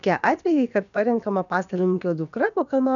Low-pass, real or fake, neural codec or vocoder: 7.2 kHz; fake; codec, 16 kHz, about 1 kbps, DyCAST, with the encoder's durations